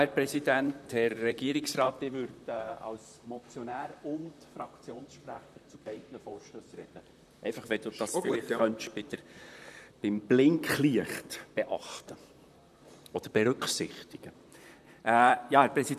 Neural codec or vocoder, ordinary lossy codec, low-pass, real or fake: vocoder, 44.1 kHz, 128 mel bands, Pupu-Vocoder; none; 14.4 kHz; fake